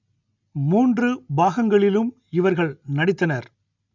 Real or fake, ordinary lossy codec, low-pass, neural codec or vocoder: real; none; 7.2 kHz; none